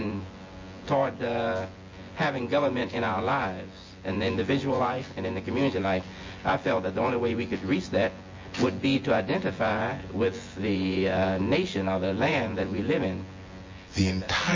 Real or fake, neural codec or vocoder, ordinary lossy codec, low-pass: fake; vocoder, 24 kHz, 100 mel bands, Vocos; MP3, 32 kbps; 7.2 kHz